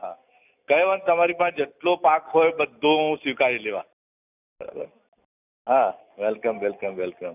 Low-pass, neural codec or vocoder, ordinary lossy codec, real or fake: 3.6 kHz; none; none; real